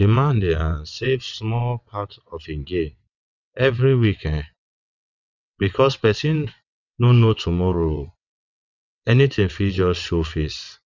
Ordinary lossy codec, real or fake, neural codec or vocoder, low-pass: none; fake; vocoder, 22.05 kHz, 80 mel bands, WaveNeXt; 7.2 kHz